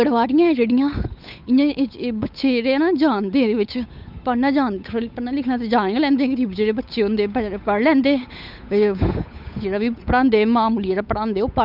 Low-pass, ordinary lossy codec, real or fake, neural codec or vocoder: 5.4 kHz; none; fake; codec, 16 kHz, 8 kbps, FunCodec, trained on Chinese and English, 25 frames a second